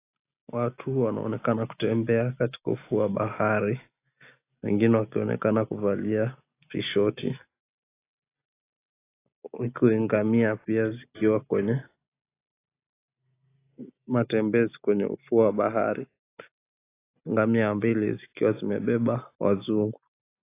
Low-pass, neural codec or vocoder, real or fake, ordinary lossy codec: 3.6 kHz; none; real; AAC, 24 kbps